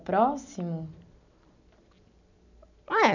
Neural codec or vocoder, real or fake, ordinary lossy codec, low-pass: none; real; none; 7.2 kHz